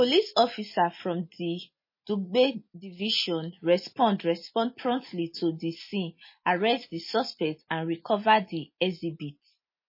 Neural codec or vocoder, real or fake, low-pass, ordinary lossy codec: none; real; 5.4 kHz; MP3, 24 kbps